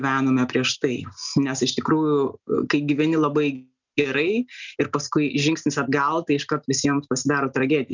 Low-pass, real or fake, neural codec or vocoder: 7.2 kHz; real; none